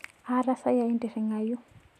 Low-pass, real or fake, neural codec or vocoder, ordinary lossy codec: 14.4 kHz; real; none; none